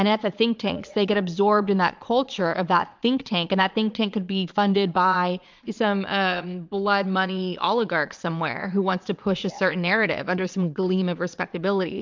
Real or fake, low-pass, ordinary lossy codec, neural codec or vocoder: fake; 7.2 kHz; MP3, 64 kbps; vocoder, 22.05 kHz, 80 mel bands, Vocos